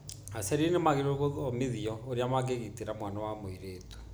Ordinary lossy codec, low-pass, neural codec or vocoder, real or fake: none; none; none; real